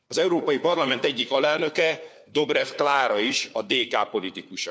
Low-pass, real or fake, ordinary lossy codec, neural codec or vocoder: none; fake; none; codec, 16 kHz, 4 kbps, FunCodec, trained on LibriTTS, 50 frames a second